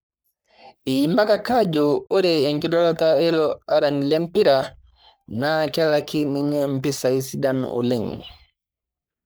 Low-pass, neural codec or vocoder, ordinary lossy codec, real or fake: none; codec, 44.1 kHz, 3.4 kbps, Pupu-Codec; none; fake